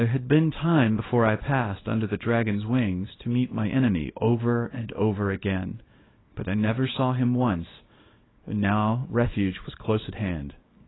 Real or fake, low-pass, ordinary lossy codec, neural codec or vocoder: fake; 7.2 kHz; AAC, 16 kbps; codec, 24 kHz, 0.9 kbps, WavTokenizer, small release